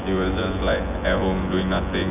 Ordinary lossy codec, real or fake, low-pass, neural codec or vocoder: none; fake; 3.6 kHz; vocoder, 24 kHz, 100 mel bands, Vocos